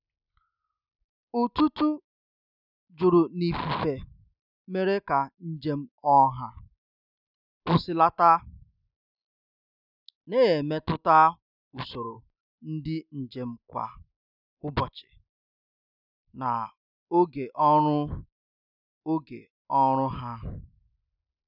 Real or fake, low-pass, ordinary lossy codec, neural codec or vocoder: real; 5.4 kHz; none; none